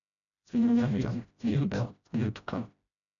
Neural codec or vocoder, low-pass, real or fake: codec, 16 kHz, 0.5 kbps, FreqCodec, smaller model; 7.2 kHz; fake